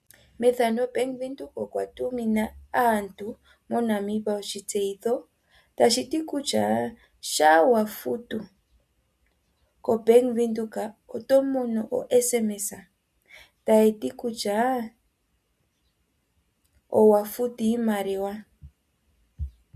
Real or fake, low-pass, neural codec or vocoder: real; 14.4 kHz; none